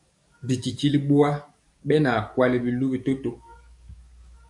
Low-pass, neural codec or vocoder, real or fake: 10.8 kHz; codec, 44.1 kHz, 7.8 kbps, DAC; fake